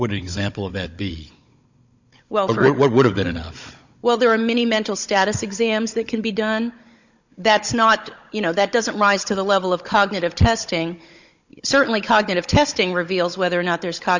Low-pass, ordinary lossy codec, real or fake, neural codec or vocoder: 7.2 kHz; Opus, 64 kbps; fake; codec, 16 kHz, 16 kbps, FunCodec, trained on Chinese and English, 50 frames a second